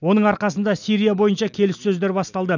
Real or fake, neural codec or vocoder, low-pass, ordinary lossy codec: real; none; 7.2 kHz; none